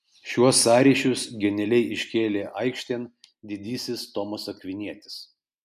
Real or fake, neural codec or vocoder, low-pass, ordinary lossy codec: real; none; 14.4 kHz; MP3, 96 kbps